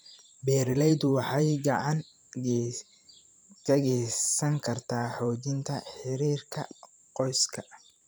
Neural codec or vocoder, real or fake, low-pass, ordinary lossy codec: vocoder, 44.1 kHz, 128 mel bands every 512 samples, BigVGAN v2; fake; none; none